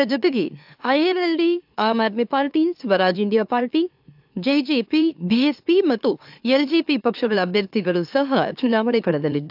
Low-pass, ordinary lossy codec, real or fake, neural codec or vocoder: 5.4 kHz; AAC, 48 kbps; fake; autoencoder, 44.1 kHz, a latent of 192 numbers a frame, MeloTTS